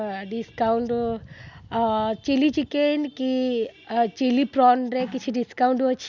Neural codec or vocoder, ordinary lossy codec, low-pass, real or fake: none; Opus, 64 kbps; 7.2 kHz; real